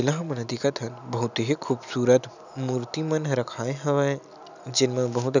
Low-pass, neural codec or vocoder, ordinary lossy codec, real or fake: 7.2 kHz; none; none; real